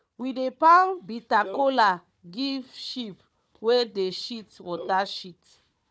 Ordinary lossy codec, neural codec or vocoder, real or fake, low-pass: none; codec, 16 kHz, 4 kbps, FunCodec, trained on Chinese and English, 50 frames a second; fake; none